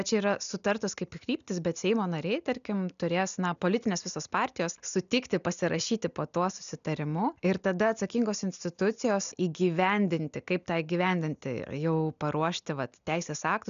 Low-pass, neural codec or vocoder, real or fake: 7.2 kHz; none; real